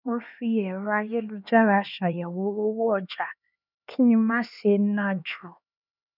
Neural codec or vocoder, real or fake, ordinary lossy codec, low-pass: codec, 16 kHz, 4 kbps, X-Codec, HuBERT features, trained on LibriSpeech; fake; none; 5.4 kHz